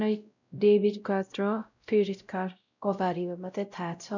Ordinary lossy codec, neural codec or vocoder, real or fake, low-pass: none; codec, 16 kHz, 0.5 kbps, X-Codec, WavLM features, trained on Multilingual LibriSpeech; fake; 7.2 kHz